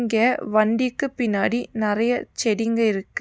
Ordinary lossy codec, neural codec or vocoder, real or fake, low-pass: none; none; real; none